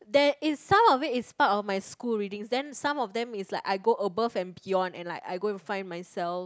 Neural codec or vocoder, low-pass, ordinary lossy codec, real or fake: none; none; none; real